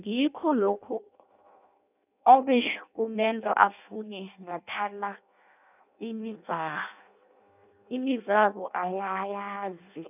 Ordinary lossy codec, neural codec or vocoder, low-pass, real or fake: none; codec, 16 kHz in and 24 kHz out, 0.6 kbps, FireRedTTS-2 codec; 3.6 kHz; fake